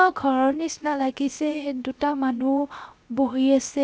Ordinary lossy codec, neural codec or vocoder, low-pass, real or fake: none; codec, 16 kHz, 0.7 kbps, FocalCodec; none; fake